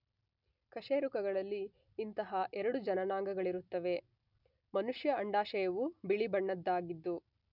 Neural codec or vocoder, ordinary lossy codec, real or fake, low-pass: none; none; real; 5.4 kHz